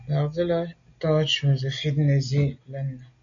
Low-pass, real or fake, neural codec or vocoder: 7.2 kHz; real; none